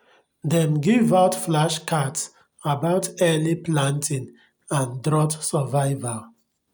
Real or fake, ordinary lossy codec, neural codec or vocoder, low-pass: real; none; none; none